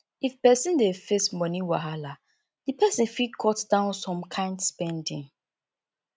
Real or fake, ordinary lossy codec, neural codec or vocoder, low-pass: real; none; none; none